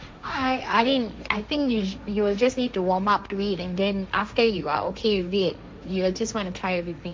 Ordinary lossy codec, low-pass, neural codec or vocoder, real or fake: none; none; codec, 16 kHz, 1.1 kbps, Voila-Tokenizer; fake